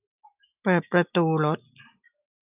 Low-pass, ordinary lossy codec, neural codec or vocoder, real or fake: 3.6 kHz; none; none; real